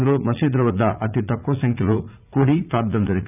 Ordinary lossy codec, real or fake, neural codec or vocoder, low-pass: none; fake; vocoder, 44.1 kHz, 80 mel bands, Vocos; 3.6 kHz